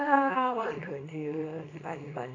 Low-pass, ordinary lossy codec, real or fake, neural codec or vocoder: 7.2 kHz; AAC, 32 kbps; fake; codec, 24 kHz, 0.9 kbps, WavTokenizer, small release